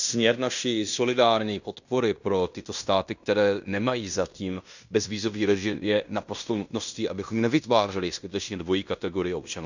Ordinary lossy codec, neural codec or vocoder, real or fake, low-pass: none; codec, 16 kHz in and 24 kHz out, 0.9 kbps, LongCat-Audio-Codec, fine tuned four codebook decoder; fake; 7.2 kHz